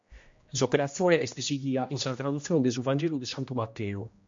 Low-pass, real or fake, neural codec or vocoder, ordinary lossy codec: 7.2 kHz; fake; codec, 16 kHz, 1 kbps, X-Codec, HuBERT features, trained on general audio; MP3, 48 kbps